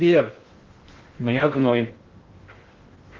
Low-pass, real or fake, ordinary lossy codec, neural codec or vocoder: 7.2 kHz; fake; Opus, 16 kbps; codec, 16 kHz in and 24 kHz out, 0.6 kbps, FocalCodec, streaming, 2048 codes